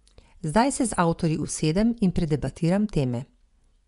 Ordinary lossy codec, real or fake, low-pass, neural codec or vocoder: none; fake; 10.8 kHz; vocoder, 24 kHz, 100 mel bands, Vocos